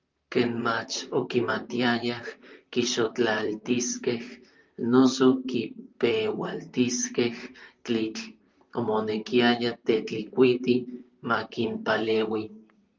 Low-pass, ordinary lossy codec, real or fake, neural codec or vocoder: 7.2 kHz; Opus, 24 kbps; fake; vocoder, 44.1 kHz, 128 mel bands, Pupu-Vocoder